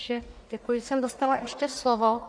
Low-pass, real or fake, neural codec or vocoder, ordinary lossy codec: 9.9 kHz; fake; codec, 44.1 kHz, 1.7 kbps, Pupu-Codec; AAC, 64 kbps